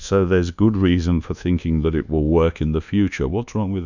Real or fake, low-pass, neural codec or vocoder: fake; 7.2 kHz; codec, 24 kHz, 1.2 kbps, DualCodec